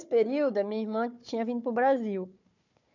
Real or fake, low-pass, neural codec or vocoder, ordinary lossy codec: fake; 7.2 kHz; codec, 16 kHz, 4 kbps, FunCodec, trained on Chinese and English, 50 frames a second; none